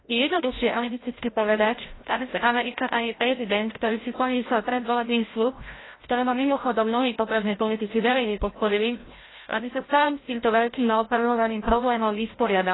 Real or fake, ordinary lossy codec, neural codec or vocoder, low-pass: fake; AAC, 16 kbps; codec, 16 kHz, 0.5 kbps, FreqCodec, larger model; 7.2 kHz